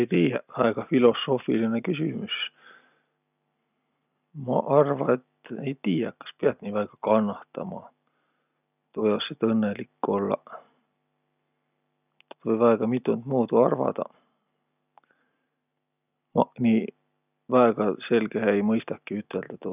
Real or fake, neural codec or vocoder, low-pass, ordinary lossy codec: real; none; 3.6 kHz; none